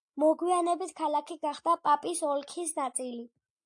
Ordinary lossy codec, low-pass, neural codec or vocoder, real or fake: AAC, 64 kbps; 10.8 kHz; none; real